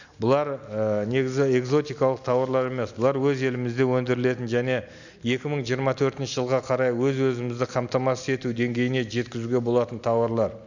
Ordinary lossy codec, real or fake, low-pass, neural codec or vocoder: none; real; 7.2 kHz; none